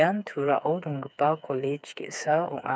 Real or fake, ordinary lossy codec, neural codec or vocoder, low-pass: fake; none; codec, 16 kHz, 4 kbps, FreqCodec, smaller model; none